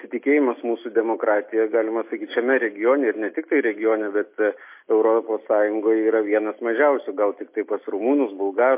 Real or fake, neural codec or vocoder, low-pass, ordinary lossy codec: real; none; 3.6 kHz; MP3, 24 kbps